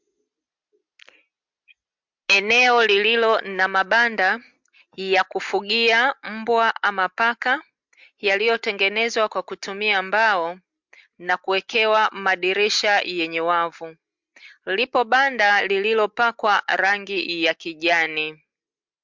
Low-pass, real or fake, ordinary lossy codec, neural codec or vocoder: 7.2 kHz; real; MP3, 64 kbps; none